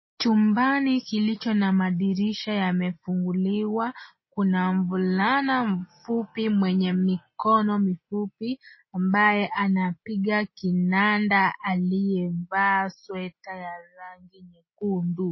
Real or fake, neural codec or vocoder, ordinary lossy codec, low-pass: real; none; MP3, 24 kbps; 7.2 kHz